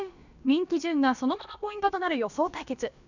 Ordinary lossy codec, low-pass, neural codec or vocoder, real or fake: none; 7.2 kHz; codec, 16 kHz, about 1 kbps, DyCAST, with the encoder's durations; fake